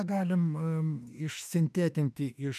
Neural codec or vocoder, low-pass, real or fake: autoencoder, 48 kHz, 32 numbers a frame, DAC-VAE, trained on Japanese speech; 14.4 kHz; fake